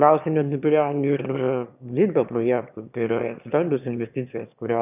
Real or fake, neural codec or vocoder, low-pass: fake; autoencoder, 22.05 kHz, a latent of 192 numbers a frame, VITS, trained on one speaker; 3.6 kHz